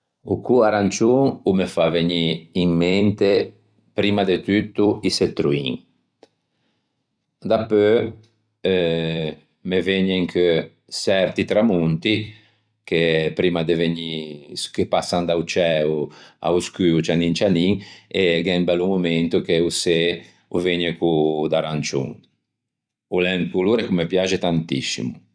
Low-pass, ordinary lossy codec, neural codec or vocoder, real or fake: 9.9 kHz; none; vocoder, 44.1 kHz, 128 mel bands every 256 samples, BigVGAN v2; fake